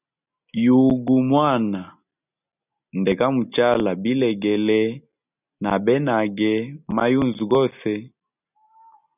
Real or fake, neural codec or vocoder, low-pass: real; none; 3.6 kHz